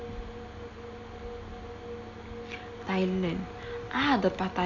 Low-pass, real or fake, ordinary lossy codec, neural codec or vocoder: 7.2 kHz; real; none; none